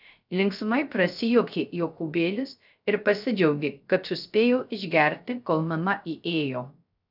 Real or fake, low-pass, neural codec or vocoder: fake; 5.4 kHz; codec, 16 kHz, 0.3 kbps, FocalCodec